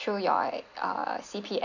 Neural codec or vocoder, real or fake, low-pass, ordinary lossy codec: none; real; 7.2 kHz; none